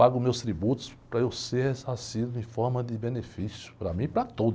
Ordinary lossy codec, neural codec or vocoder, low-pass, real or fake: none; none; none; real